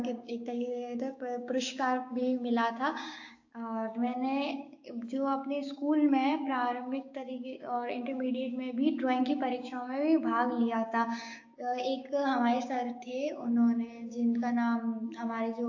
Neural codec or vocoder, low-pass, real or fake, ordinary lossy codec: codec, 16 kHz, 6 kbps, DAC; 7.2 kHz; fake; AAC, 48 kbps